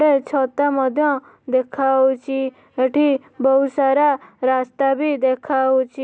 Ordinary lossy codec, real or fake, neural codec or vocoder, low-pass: none; real; none; none